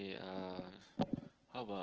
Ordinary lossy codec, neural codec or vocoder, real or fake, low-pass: Opus, 16 kbps; none; real; 7.2 kHz